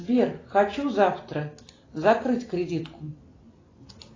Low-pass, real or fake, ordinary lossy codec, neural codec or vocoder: 7.2 kHz; real; AAC, 32 kbps; none